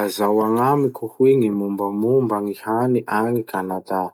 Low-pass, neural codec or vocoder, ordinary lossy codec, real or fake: 19.8 kHz; none; none; real